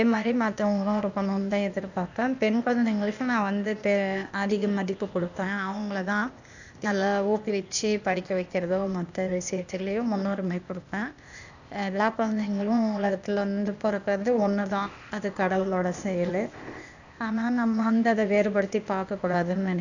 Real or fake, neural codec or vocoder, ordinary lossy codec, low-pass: fake; codec, 16 kHz, 0.8 kbps, ZipCodec; none; 7.2 kHz